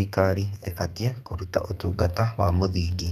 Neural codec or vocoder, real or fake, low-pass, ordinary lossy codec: codec, 32 kHz, 1.9 kbps, SNAC; fake; 14.4 kHz; none